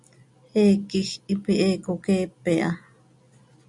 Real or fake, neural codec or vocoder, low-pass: real; none; 10.8 kHz